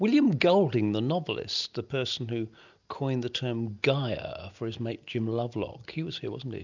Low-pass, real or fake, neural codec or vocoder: 7.2 kHz; real; none